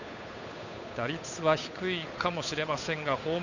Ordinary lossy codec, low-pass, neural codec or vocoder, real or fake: none; 7.2 kHz; codec, 16 kHz, 8 kbps, FunCodec, trained on Chinese and English, 25 frames a second; fake